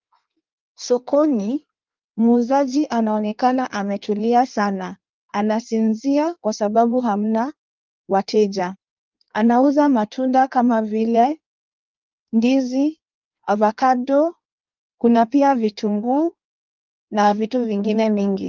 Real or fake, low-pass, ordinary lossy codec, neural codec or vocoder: fake; 7.2 kHz; Opus, 24 kbps; codec, 16 kHz in and 24 kHz out, 1.1 kbps, FireRedTTS-2 codec